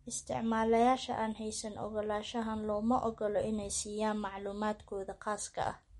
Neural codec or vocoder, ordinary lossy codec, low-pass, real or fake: none; MP3, 48 kbps; 19.8 kHz; real